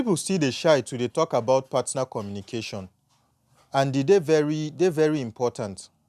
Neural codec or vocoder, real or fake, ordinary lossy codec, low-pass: none; real; none; 14.4 kHz